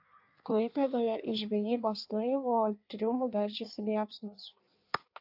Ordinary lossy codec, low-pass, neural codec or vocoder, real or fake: AAC, 48 kbps; 5.4 kHz; codec, 16 kHz in and 24 kHz out, 1.1 kbps, FireRedTTS-2 codec; fake